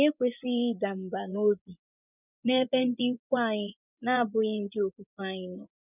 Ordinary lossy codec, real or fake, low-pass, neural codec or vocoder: none; fake; 3.6 kHz; vocoder, 24 kHz, 100 mel bands, Vocos